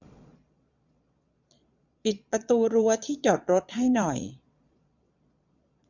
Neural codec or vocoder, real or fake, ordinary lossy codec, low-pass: vocoder, 22.05 kHz, 80 mel bands, Vocos; fake; none; 7.2 kHz